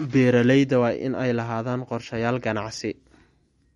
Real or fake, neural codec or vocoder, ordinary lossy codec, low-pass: real; none; MP3, 48 kbps; 19.8 kHz